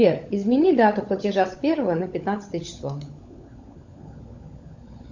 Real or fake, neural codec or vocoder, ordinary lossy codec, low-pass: fake; codec, 16 kHz, 16 kbps, FunCodec, trained on LibriTTS, 50 frames a second; Opus, 64 kbps; 7.2 kHz